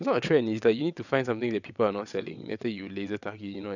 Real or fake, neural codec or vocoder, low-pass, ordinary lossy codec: fake; vocoder, 22.05 kHz, 80 mel bands, WaveNeXt; 7.2 kHz; none